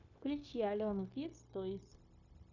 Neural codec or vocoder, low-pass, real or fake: codec, 16 kHz, 0.9 kbps, LongCat-Audio-Codec; 7.2 kHz; fake